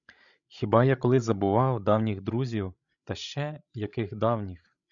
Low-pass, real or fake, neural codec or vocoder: 7.2 kHz; fake; codec, 16 kHz, 16 kbps, FreqCodec, larger model